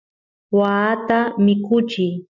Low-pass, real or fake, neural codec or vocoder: 7.2 kHz; real; none